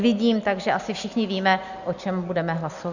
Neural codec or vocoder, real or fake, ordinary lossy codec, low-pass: none; real; Opus, 64 kbps; 7.2 kHz